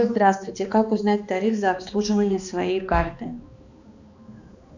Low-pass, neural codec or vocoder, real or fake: 7.2 kHz; codec, 16 kHz, 2 kbps, X-Codec, HuBERT features, trained on balanced general audio; fake